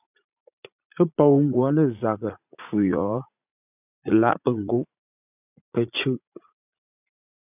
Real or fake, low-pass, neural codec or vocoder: fake; 3.6 kHz; vocoder, 44.1 kHz, 80 mel bands, Vocos